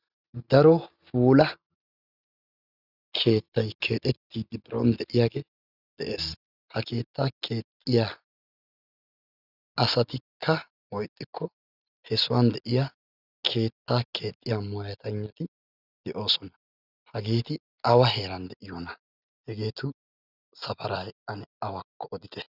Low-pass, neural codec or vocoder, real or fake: 5.4 kHz; none; real